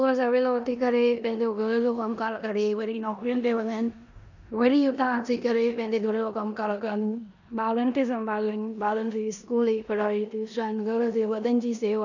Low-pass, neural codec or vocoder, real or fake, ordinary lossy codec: 7.2 kHz; codec, 16 kHz in and 24 kHz out, 0.9 kbps, LongCat-Audio-Codec, four codebook decoder; fake; none